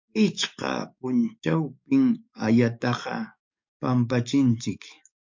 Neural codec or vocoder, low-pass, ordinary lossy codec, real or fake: codec, 44.1 kHz, 7.8 kbps, DAC; 7.2 kHz; MP3, 48 kbps; fake